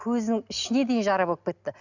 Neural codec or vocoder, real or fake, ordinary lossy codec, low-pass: none; real; none; 7.2 kHz